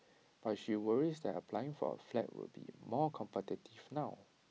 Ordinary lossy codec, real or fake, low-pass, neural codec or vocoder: none; real; none; none